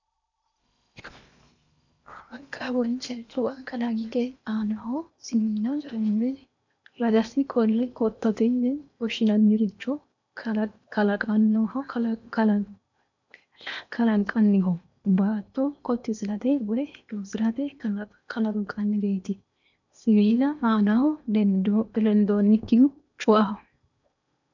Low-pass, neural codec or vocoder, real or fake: 7.2 kHz; codec, 16 kHz in and 24 kHz out, 0.8 kbps, FocalCodec, streaming, 65536 codes; fake